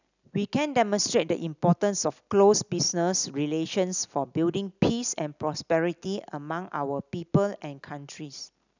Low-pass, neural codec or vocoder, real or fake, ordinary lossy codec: 7.2 kHz; none; real; none